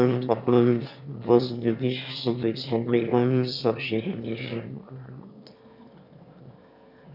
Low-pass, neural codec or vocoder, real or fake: 5.4 kHz; autoencoder, 22.05 kHz, a latent of 192 numbers a frame, VITS, trained on one speaker; fake